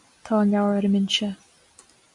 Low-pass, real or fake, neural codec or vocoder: 10.8 kHz; real; none